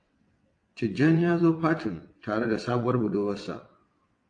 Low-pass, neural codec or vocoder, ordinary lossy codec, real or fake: 9.9 kHz; vocoder, 22.05 kHz, 80 mel bands, WaveNeXt; AAC, 48 kbps; fake